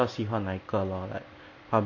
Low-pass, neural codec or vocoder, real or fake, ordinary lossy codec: 7.2 kHz; none; real; none